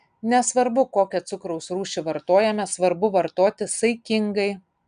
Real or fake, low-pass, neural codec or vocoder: real; 9.9 kHz; none